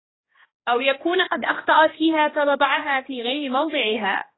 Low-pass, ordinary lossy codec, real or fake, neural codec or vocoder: 7.2 kHz; AAC, 16 kbps; fake; codec, 16 kHz, 1 kbps, X-Codec, HuBERT features, trained on general audio